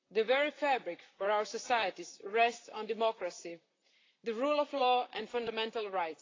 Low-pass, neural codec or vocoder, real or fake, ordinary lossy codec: 7.2 kHz; vocoder, 44.1 kHz, 128 mel bands, Pupu-Vocoder; fake; AAC, 32 kbps